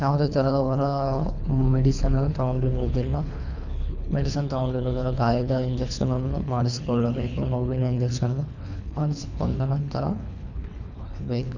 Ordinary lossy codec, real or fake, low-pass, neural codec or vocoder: none; fake; 7.2 kHz; codec, 24 kHz, 3 kbps, HILCodec